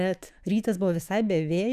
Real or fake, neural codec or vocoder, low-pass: fake; autoencoder, 48 kHz, 128 numbers a frame, DAC-VAE, trained on Japanese speech; 14.4 kHz